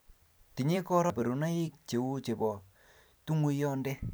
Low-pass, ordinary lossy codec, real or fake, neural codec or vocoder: none; none; fake; vocoder, 44.1 kHz, 128 mel bands every 256 samples, BigVGAN v2